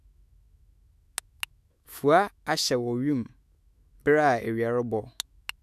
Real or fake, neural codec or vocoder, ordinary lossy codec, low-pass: fake; autoencoder, 48 kHz, 128 numbers a frame, DAC-VAE, trained on Japanese speech; none; 14.4 kHz